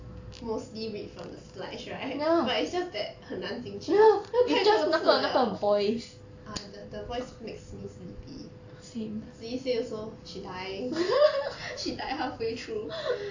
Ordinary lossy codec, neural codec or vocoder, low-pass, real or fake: AAC, 48 kbps; none; 7.2 kHz; real